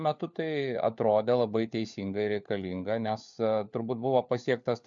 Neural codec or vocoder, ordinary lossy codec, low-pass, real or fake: codec, 16 kHz, 4 kbps, FunCodec, trained on LibriTTS, 50 frames a second; MP3, 64 kbps; 7.2 kHz; fake